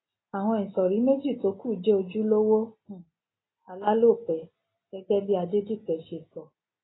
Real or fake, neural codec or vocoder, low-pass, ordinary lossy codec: real; none; 7.2 kHz; AAC, 16 kbps